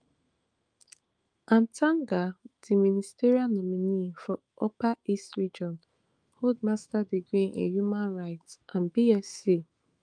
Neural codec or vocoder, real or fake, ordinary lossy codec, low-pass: autoencoder, 48 kHz, 128 numbers a frame, DAC-VAE, trained on Japanese speech; fake; Opus, 32 kbps; 9.9 kHz